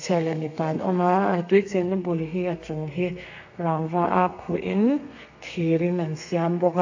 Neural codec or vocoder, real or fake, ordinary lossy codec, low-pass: codec, 32 kHz, 1.9 kbps, SNAC; fake; AAC, 48 kbps; 7.2 kHz